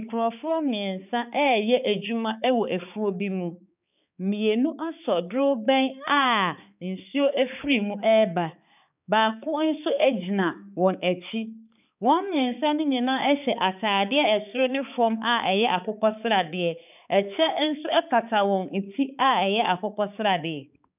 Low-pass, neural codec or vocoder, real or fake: 3.6 kHz; codec, 16 kHz, 4 kbps, X-Codec, HuBERT features, trained on balanced general audio; fake